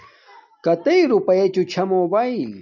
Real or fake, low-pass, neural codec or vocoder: real; 7.2 kHz; none